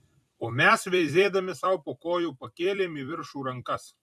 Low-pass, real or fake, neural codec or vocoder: 14.4 kHz; fake; vocoder, 44.1 kHz, 128 mel bands every 256 samples, BigVGAN v2